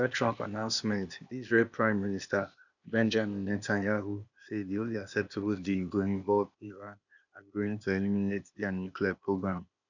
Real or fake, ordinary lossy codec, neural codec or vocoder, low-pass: fake; none; codec, 16 kHz, 0.8 kbps, ZipCodec; 7.2 kHz